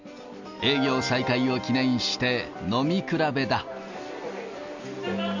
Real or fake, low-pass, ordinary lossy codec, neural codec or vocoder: real; 7.2 kHz; none; none